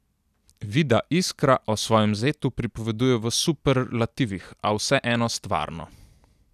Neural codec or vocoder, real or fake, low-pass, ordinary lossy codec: none; real; 14.4 kHz; none